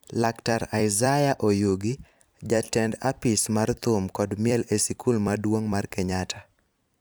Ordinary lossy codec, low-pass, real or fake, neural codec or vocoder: none; none; fake; vocoder, 44.1 kHz, 128 mel bands every 256 samples, BigVGAN v2